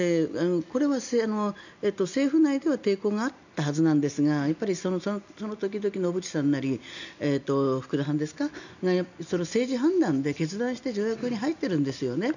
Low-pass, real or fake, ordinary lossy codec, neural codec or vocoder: 7.2 kHz; real; none; none